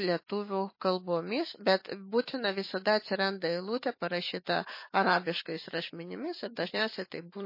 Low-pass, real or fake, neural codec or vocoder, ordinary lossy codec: 5.4 kHz; fake; autoencoder, 48 kHz, 128 numbers a frame, DAC-VAE, trained on Japanese speech; MP3, 24 kbps